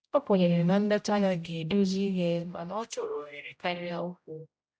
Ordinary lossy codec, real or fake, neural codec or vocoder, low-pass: none; fake; codec, 16 kHz, 0.5 kbps, X-Codec, HuBERT features, trained on general audio; none